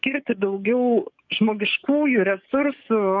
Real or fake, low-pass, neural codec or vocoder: fake; 7.2 kHz; codec, 44.1 kHz, 7.8 kbps, DAC